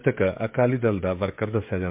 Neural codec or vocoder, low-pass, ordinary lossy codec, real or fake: codec, 16 kHz, 16 kbps, FreqCodec, smaller model; 3.6 kHz; MP3, 32 kbps; fake